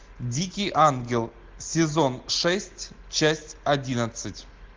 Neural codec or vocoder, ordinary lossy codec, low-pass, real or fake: none; Opus, 16 kbps; 7.2 kHz; real